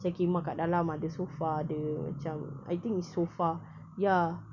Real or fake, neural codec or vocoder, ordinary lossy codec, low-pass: real; none; none; 7.2 kHz